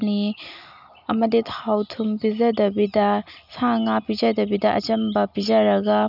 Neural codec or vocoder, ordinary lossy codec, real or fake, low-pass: none; none; real; 5.4 kHz